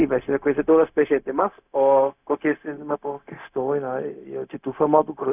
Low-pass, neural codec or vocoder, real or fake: 3.6 kHz; codec, 16 kHz, 0.4 kbps, LongCat-Audio-Codec; fake